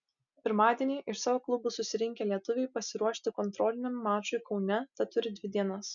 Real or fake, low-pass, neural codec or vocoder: real; 7.2 kHz; none